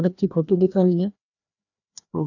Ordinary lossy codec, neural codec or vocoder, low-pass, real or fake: none; codec, 16 kHz, 1 kbps, FreqCodec, larger model; 7.2 kHz; fake